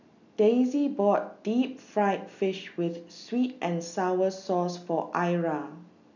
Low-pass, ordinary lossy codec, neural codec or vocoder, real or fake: 7.2 kHz; none; none; real